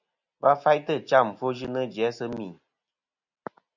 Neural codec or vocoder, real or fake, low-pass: none; real; 7.2 kHz